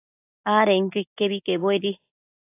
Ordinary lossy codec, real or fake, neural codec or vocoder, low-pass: AAC, 24 kbps; real; none; 3.6 kHz